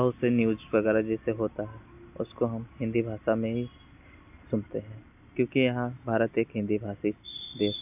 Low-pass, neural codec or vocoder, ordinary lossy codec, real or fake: 3.6 kHz; none; none; real